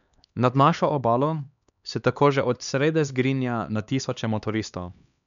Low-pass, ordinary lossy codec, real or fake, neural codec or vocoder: 7.2 kHz; none; fake; codec, 16 kHz, 2 kbps, X-Codec, HuBERT features, trained on LibriSpeech